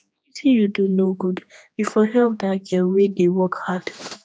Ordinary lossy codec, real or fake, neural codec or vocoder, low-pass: none; fake; codec, 16 kHz, 2 kbps, X-Codec, HuBERT features, trained on general audio; none